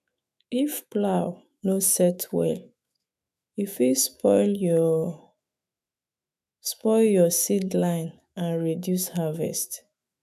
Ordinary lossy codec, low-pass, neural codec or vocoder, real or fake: none; 14.4 kHz; autoencoder, 48 kHz, 128 numbers a frame, DAC-VAE, trained on Japanese speech; fake